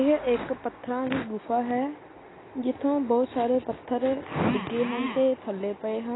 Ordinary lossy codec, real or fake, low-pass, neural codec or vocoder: AAC, 16 kbps; real; 7.2 kHz; none